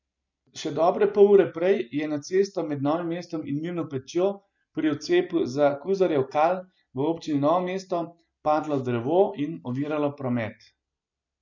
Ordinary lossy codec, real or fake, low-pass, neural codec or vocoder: none; real; 7.2 kHz; none